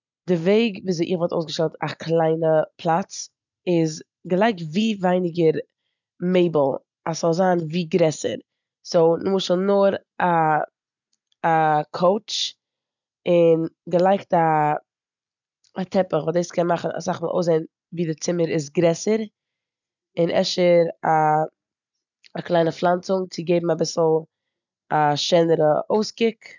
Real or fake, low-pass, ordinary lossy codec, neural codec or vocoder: real; 7.2 kHz; none; none